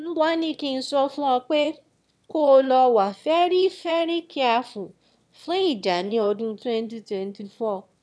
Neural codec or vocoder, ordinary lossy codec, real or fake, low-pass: autoencoder, 22.05 kHz, a latent of 192 numbers a frame, VITS, trained on one speaker; none; fake; none